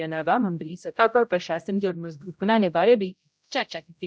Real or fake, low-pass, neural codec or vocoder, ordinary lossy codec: fake; none; codec, 16 kHz, 0.5 kbps, X-Codec, HuBERT features, trained on general audio; none